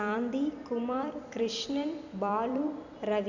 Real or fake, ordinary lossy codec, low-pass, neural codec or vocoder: fake; none; 7.2 kHz; vocoder, 44.1 kHz, 128 mel bands every 256 samples, BigVGAN v2